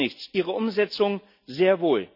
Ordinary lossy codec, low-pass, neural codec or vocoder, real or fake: none; 5.4 kHz; none; real